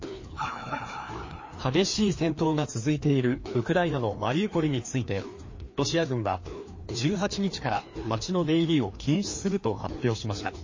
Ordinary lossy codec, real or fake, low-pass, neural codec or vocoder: MP3, 32 kbps; fake; 7.2 kHz; codec, 16 kHz, 2 kbps, FreqCodec, larger model